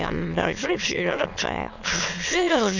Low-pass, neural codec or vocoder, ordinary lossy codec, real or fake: 7.2 kHz; autoencoder, 22.05 kHz, a latent of 192 numbers a frame, VITS, trained on many speakers; none; fake